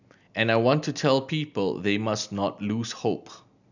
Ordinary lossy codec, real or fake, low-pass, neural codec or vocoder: none; real; 7.2 kHz; none